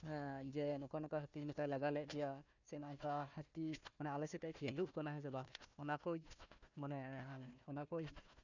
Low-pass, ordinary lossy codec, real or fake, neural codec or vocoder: 7.2 kHz; none; fake; codec, 16 kHz, 1 kbps, FunCodec, trained on Chinese and English, 50 frames a second